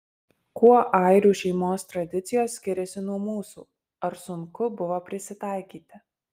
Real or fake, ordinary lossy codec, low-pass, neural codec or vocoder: real; Opus, 32 kbps; 14.4 kHz; none